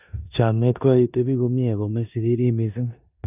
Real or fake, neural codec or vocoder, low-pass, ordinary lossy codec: fake; codec, 16 kHz in and 24 kHz out, 0.9 kbps, LongCat-Audio-Codec, four codebook decoder; 3.6 kHz; none